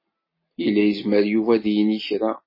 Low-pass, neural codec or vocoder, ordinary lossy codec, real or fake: 5.4 kHz; none; MP3, 24 kbps; real